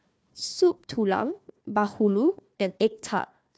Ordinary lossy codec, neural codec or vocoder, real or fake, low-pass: none; codec, 16 kHz, 1 kbps, FunCodec, trained on Chinese and English, 50 frames a second; fake; none